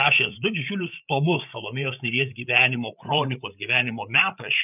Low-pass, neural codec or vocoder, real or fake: 3.6 kHz; codec, 16 kHz, 8 kbps, FreqCodec, larger model; fake